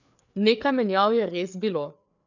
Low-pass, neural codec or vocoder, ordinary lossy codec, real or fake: 7.2 kHz; codec, 16 kHz, 4 kbps, FreqCodec, larger model; none; fake